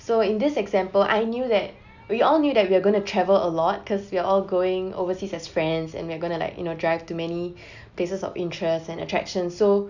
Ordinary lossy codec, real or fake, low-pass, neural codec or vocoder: none; real; 7.2 kHz; none